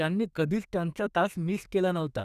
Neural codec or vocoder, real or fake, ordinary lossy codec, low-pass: codec, 32 kHz, 1.9 kbps, SNAC; fake; none; 14.4 kHz